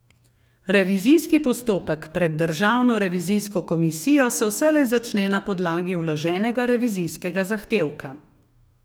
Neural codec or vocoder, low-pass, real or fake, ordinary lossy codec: codec, 44.1 kHz, 2.6 kbps, DAC; none; fake; none